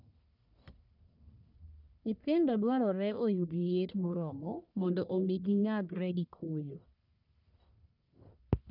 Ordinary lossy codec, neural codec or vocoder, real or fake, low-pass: none; codec, 44.1 kHz, 1.7 kbps, Pupu-Codec; fake; 5.4 kHz